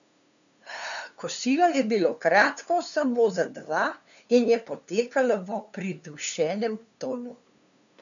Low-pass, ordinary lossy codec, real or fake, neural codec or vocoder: 7.2 kHz; none; fake; codec, 16 kHz, 2 kbps, FunCodec, trained on LibriTTS, 25 frames a second